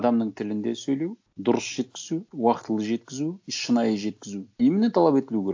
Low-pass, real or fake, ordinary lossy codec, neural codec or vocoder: 7.2 kHz; real; AAC, 48 kbps; none